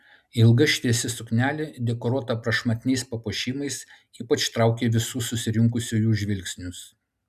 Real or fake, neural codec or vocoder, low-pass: real; none; 14.4 kHz